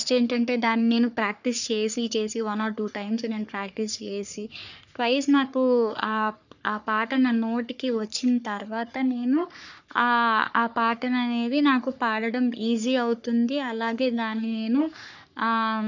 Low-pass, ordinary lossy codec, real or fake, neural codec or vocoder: 7.2 kHz; none; fake; codec, 44.1 kHz, 3.4 kbps, Pupu-Codec